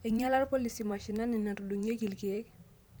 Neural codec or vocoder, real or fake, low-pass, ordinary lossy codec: vocoder, 44.1 kHz, 128 mel bands every 512 samples, BigVGAN v2; fake; none; none